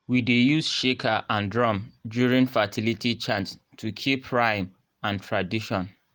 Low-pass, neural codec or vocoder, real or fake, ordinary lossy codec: 19.8 kHz; none; real; Opus, 24 kbps